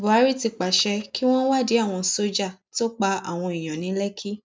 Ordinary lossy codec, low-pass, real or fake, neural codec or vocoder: none; none; real; none